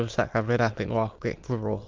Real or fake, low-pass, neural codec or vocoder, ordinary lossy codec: fake; 7.2 kHz; autoencoder, 22.05 kHz, a latent of 192 numbers a frame, VITS, trained on many speakers; Opus, 32 kbps